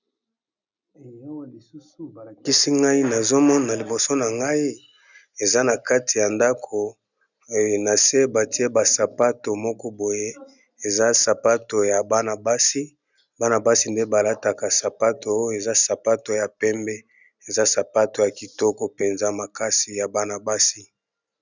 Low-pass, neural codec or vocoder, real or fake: 7.2 kHz; none; real